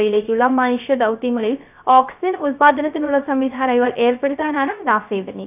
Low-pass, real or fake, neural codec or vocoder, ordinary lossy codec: 3.6 kHz; fake; codec, 16 kHz, 0.3 kbps, FocalCodec; none